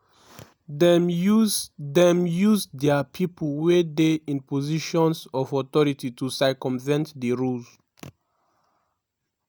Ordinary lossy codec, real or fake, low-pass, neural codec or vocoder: none; real; none; none